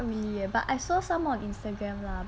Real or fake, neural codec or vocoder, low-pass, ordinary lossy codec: real; none; none; none